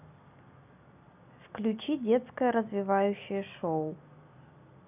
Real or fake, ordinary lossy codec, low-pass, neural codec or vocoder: real; none; 3.6 kHz; none